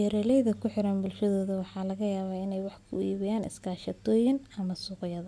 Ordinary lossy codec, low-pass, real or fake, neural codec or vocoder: none; none; real; none